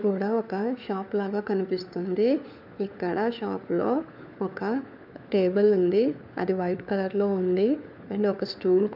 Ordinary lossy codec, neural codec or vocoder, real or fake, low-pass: none; codec, 16 kHz, 4 kbps, FunCodec, trained on LibriTTS, 50 frames a second; fake; 5.4 kHz